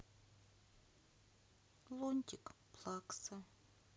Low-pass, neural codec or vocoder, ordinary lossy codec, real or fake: none; none; none; real